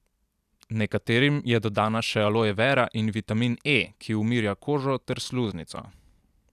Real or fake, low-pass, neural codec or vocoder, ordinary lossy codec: real; 14.4 kHz; none; none